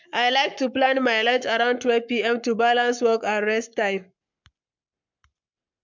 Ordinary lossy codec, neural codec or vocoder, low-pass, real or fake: MP3, 64 kbps; codec, 44.1 kHz, 7.8 kbps, Pupu-Codec; 7.2 kHz; fake